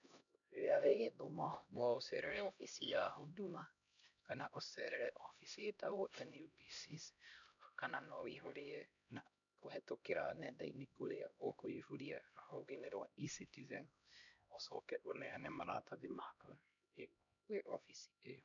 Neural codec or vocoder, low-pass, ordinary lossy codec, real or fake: codec, 16 kHz, 1 kbps, X-Codec, HuBERT features, trained on LibriSpeech; 7.2 kHz; none; fake